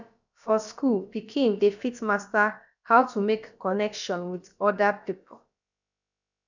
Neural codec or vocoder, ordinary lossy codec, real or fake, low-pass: codec, 16 kHz, about 1 kbps, DyCAST, with the encoder's durations; none; fake; 7.2 kHz